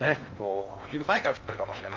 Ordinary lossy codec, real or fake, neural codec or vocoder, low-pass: Opus, 32 kbps; fake; codec, 16 kHz in and 24 kHz out, 0.8 kbps, FocalCodec, streaming, 65536 codes; 7.2 kHz